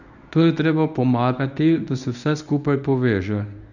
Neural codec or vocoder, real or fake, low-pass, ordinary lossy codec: codec, 24 kHz, 0.9 kbps, WavTokenizer, medium speech release version 1; fake; 7.2 kHz; none